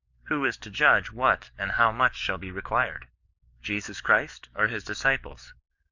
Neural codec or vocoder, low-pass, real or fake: codec, 44.1 kHz, 7.8 kbps, DAC; 7.2 kHz; fake